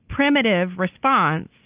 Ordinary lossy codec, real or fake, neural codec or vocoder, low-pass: Opus, 32 kbps; real; none; 3.6 kHz